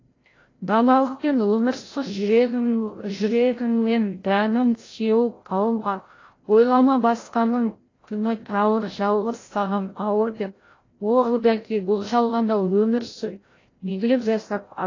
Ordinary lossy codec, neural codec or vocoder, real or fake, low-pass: AAC, 32 kbps; codec, 16 kHz, 0.5 kbps, FreqCodec, larger model; fake; 7.2 kHz